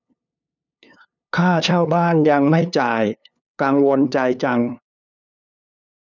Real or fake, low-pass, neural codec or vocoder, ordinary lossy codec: fake; 7.2 kHz; codec, 16 kHz, 2 kbps, FunCodec, trained on LibriTTS, 25 frames a second; none